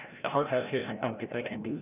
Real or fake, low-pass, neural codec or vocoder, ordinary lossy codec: fake; 3.6 kHz; codec, 16 kHz, 0.5 kbps, FreqCodec, larger model; none